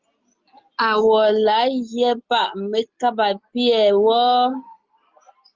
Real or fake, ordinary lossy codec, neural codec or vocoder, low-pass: real; Opus, 24 kbps; none; 7.2 kHz